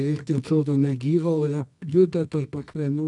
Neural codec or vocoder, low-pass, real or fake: codec, 24 kHz, 0.9 kbps, WavTokenizer, medium music audio release; 10.8 kHz; fake